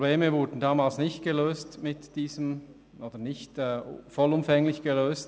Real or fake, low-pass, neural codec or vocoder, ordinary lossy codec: real; none; none; none